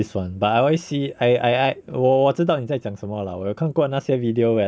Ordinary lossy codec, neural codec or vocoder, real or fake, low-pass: none; none; real; none